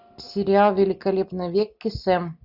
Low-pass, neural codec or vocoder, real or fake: 5.4 kHz; none; real